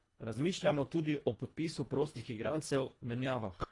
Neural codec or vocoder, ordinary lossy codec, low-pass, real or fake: codec, 24 kHz, 1.5 kbps, HILCodec; AAC, 32 kbps; 10.8 kHz; fake